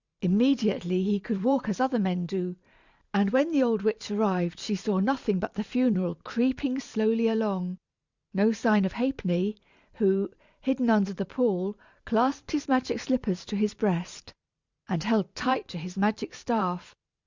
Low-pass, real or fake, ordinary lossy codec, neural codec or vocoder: 7.2 kHz; fake; Opus, 64 kbps; vocoder, 44.1 kHz, 128 mel bands every 512 samples, BigVGAN v2